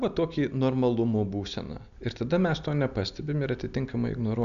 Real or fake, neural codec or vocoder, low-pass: real; none; 7.2 kHz